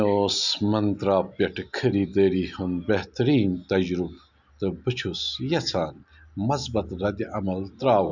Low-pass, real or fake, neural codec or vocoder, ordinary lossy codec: 7.2 kHz; real; none; none